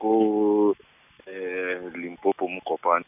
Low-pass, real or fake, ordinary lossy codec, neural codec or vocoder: 3.6 kHz; real; none; none